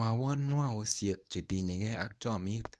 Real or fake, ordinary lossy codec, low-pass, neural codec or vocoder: fake; none; none; codec, 24 kHz, 0.9 kbps, WavTokenizer, medium speech release version 1